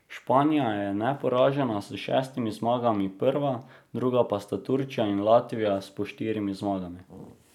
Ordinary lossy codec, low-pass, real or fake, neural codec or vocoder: none; 19.8 kHz; real; none